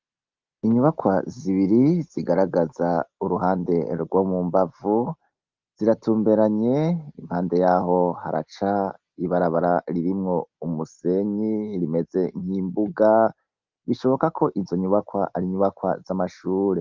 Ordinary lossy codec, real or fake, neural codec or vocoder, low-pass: Opus, 32 kbps; real; none; 7.2 kHz